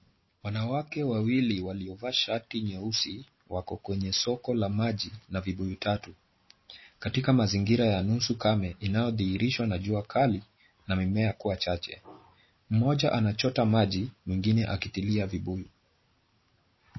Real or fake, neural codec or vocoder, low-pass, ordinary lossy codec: real; none; 7.2 kHz; MP3, 24 kbps